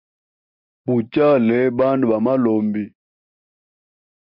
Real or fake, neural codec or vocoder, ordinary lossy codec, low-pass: real; none; MP3, 48 kbps; 5.4 kHz